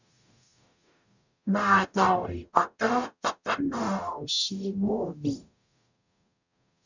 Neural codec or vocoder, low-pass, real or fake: codec, 44.1 kHz, 0.9 kbps, DAC; 7.2 kHz; fake